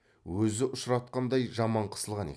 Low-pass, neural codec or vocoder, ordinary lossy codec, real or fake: none; none; none; real